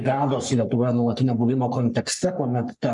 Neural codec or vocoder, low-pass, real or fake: codec, 44.1 kHz, 3.4 kbps, Pupu-Codec; 10.8 kHz; fake